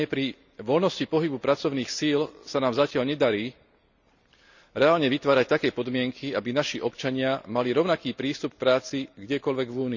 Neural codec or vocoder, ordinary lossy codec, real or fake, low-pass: none; none; real; 7.2 kHz